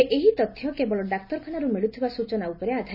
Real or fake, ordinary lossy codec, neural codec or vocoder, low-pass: real; none; none; 5.4 kHz